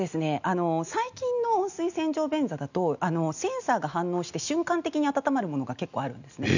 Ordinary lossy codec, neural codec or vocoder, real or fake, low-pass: none; none; real; 7.2 kHz